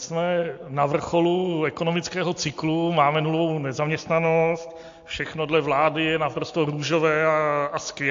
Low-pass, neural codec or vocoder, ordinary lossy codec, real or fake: 7.2 kHz; none; AAC, 48 kbps; real